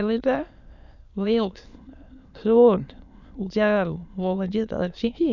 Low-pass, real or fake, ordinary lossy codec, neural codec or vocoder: 7.2 kHz; fake; none; autoencoder, 22.05 kHz, a latent of 192 numbers a frame, VITS, trained on many speakers